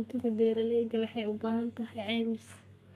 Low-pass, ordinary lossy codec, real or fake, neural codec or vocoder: 14.4 kHz; none; fake; codec, 32 kHz, 1.9 kbps, SNAC